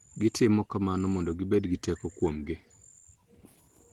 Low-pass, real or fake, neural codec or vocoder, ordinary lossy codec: 19.8 kHz; real; none; Opus, 16 kbps